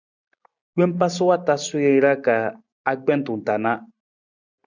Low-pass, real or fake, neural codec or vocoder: 7.2 kHz; real; none